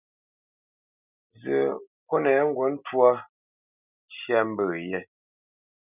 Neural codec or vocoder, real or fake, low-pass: none; real; 3.6 kHz